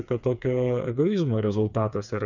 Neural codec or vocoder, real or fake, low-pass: codec, 16 kHz, 4 kbps, FreqCodec, smaller model; fake; 7.2 kHz